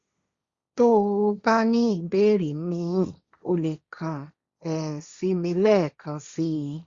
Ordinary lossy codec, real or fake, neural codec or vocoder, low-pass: Opus, 64 kbps; fake; codec, 16 kHz, 1.1 kbps, Voila-Tokenizer; 7.2 kHz